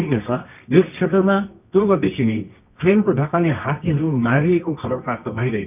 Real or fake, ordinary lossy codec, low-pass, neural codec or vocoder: fake; none; 3.6 kHz; codec, 24 kHz, 0.9 kbps, WavTokenizer, medium music audio release